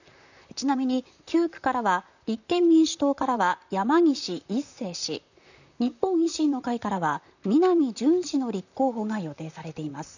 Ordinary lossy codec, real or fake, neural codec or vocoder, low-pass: none; fake; vocoder, 44.1 kHz, 128 mel bands, Pupu-Vocoder; 7.2 kHz